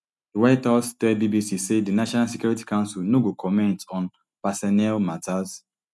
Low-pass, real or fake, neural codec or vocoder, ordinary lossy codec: none; real; none; none